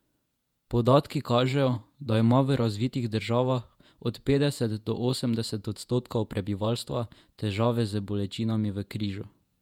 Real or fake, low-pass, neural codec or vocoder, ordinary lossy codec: fake; 19.8 kHz; vocoder, 48 kHz, 128 mel bands, Vocos; MP3, 96 kbps